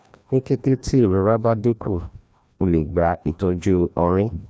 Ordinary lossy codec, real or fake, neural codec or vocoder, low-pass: none; fake; codec, 16 kHz, 1 kbps, FreqCodec, larger model; none